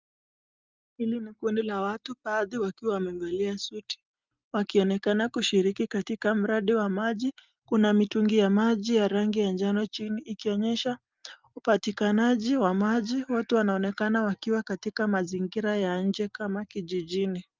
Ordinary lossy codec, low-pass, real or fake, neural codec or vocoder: Opus, 32 kbps; 7.2 kHz; real; none